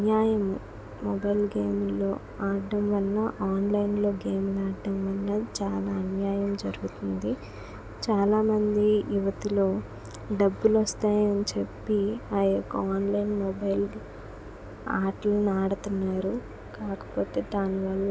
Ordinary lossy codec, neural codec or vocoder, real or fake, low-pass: none; none; real; none